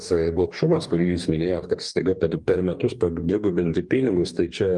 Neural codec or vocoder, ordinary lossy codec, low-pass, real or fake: codec, 44.1 kHz, 2.6 kbps, DAC; Opus, 32 kbps; 10.8 kHz; fake